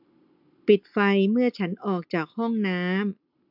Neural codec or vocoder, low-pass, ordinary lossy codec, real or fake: none; 5.4 kHz; AAC, 48 kbps; real